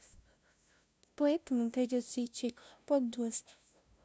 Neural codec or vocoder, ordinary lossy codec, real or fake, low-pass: codec, 16 kHz, 0.5 kbps, FunCodec, trained on LibriTTS, 25 frames a second; none; fake; none